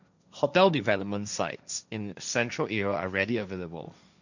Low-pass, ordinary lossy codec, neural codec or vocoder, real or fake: 7.2 kHz; none; codec, 16 kHz, 1.1 kbps, Voila-Tokenizer; fake